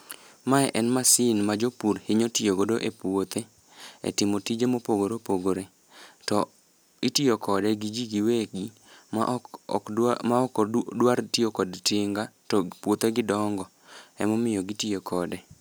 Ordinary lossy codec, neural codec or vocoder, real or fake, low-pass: none; none; real; none